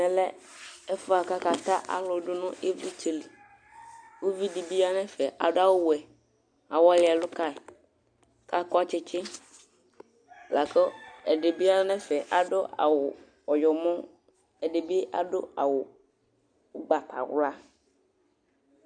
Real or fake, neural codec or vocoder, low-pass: real; none; 9.9 kHz